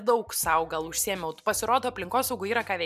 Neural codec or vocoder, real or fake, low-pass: none; real; 14.4 kHz